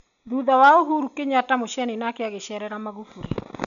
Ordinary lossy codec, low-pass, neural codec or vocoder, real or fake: none; 7.2 kHz; none; real